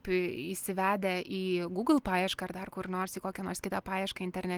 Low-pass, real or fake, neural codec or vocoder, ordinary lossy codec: 19.8 kHz; real; none; Opus, 24 kbps